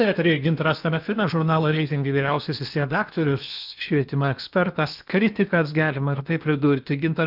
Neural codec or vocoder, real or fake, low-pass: codec, 16 kHz in and 24 kHz out, 0.8 kbps, FocalCodec, streaming, 65536 codes; fake; 5.4 kHz